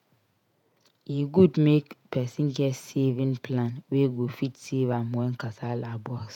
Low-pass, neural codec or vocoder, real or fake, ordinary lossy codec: 19.8 kHz; vocoder, 48 kHz, 128 mel bands, Vocos; fake; none